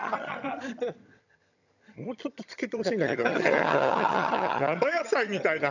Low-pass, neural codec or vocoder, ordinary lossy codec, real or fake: 7.2 kHz; vocoder, 22.05 kHz, 80 mel bands, HiFi-GAN; none; fake